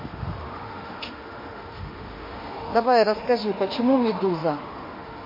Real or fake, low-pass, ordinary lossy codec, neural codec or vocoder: fake; 5.4 kHz; MP3, 24 kbps; autoencoder, 48 kHz, 32 numbers a frame, DAC-VAE, trained on Japanese speech